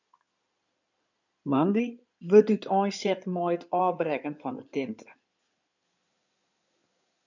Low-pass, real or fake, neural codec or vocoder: 7.2 kHz; fake; codec, 16 kHz in and 24 kHz out, 2.2 kbps, FireRedTTS-2 codec